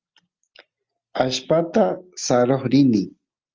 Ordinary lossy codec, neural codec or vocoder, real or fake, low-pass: Opus, 24 kbps; none; real; 7.2 kHz